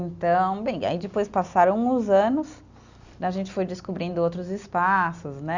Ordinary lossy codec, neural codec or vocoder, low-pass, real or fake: Opus, 64 kbps; none; 7.2 kHz; real